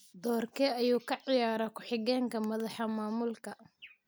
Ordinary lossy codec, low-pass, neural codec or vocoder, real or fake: none; none; none; real